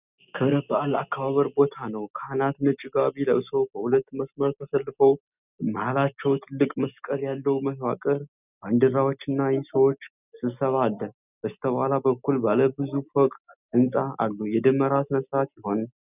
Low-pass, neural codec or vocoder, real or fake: 3.6 kHz; none; real